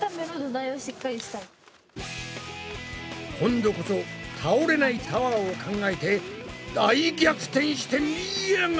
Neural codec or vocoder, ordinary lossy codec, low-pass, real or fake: none; none; none; real